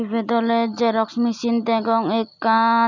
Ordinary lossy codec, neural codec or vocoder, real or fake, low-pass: none; none; real; 7.2 kHz